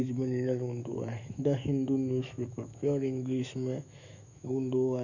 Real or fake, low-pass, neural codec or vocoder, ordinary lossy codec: fake; 7.2 kHz; autoencoder, 48 kHz, 128 numbers a frame, DAC-VAE, trained on Japanese speech; none